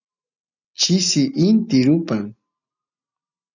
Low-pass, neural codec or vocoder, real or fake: 7.2 kHz; none; real